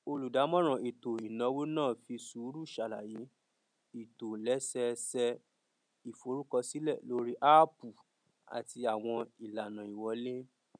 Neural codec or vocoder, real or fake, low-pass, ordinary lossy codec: none; real; 9.9 kHz; none